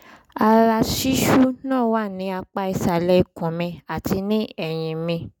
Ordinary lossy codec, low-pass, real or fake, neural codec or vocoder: none; 19.8 kHz; real; none